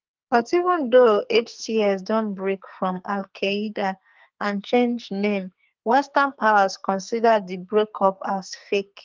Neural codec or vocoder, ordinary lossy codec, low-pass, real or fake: codec, 44.1 kHz, 2.6 kbps, SNAC; Opus, 32 kbps; 7.2 kHz; fake